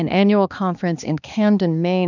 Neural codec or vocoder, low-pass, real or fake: codec, 16 kHz, 2 kbps, X-Codec, HuBERT features, trained on balanced general audio; 7.2 kHz; fake